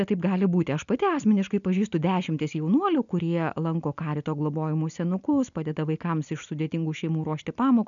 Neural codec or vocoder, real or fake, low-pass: none; real; 7.2 kHz